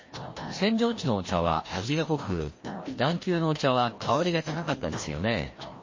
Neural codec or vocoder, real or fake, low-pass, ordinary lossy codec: codec, 16 kHz, 1 kbps, FreqCodec, larger model; fake; 7.2 kHz; MP3, 32 kbps